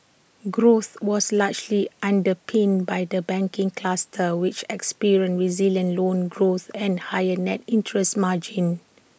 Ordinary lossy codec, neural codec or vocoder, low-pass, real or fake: none; none; none; real